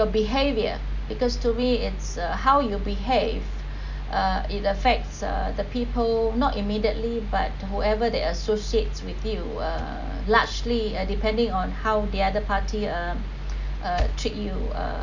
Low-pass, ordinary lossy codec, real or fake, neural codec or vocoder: 7.2 kHz; none; real; none